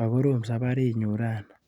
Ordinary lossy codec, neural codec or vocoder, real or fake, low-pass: Opus, 64 kbps; none; real; 19.8 kHz